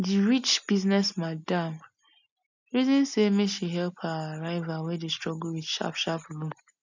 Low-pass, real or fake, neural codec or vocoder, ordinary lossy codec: 7.2 kHz; real; none; none